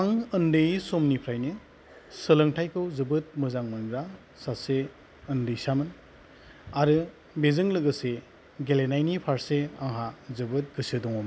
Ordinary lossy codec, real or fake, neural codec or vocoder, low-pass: none; real; none; none